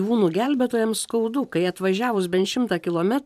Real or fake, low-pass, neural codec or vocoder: fake; 14.4 kHz; vocoder, 44.1 kHz, 128 mel bands every 512 samples, BigVGAN v2